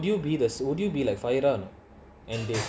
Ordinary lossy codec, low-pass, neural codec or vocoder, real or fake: none; none; none; real